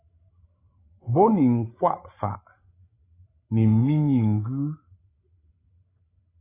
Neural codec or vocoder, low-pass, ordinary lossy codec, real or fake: codec, 16 kHz, 16 kbps, FreqCodec, larger model; 3.6 kHz; AAC, 16 kbps; fake